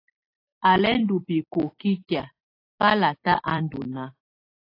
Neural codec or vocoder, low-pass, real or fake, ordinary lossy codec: vocoder, 44.1 kHz, 128 mel bands every 256 samples, BigVGAN v2; 5.4 kHz; fake; AAC, 24 kbps